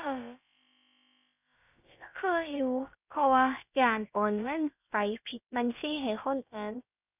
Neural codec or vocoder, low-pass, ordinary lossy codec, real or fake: codec, 16 kHz, about 1 kbps, DyCAST, with the encoder's durations; 3.6 kHz; none; fake